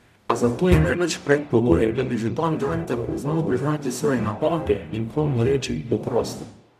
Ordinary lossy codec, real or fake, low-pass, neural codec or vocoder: none; fake; 14.4 kHz; codec, 44.1 kHz, 0.9 kbps, DAC